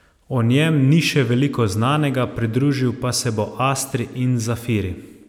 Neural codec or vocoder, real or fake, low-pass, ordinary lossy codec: none; real; 19.8 kHz; none